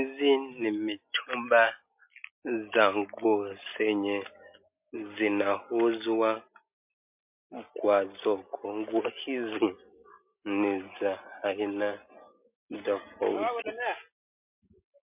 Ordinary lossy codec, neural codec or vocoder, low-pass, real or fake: MP3, 32 kbps; none; 3.6 kHz; real